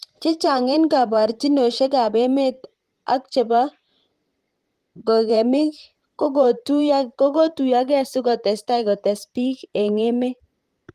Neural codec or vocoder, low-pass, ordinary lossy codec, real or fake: vocoder, 44.1 kHz, 128 mel bands, Pupu-Vocoder; 14.4 kHz; Opus, 24 kbps; fake